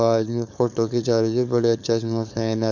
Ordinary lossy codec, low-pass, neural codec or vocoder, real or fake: none; 7.2 kHz; codec, 16 kHz, 4.8 kbps, FACodec; fake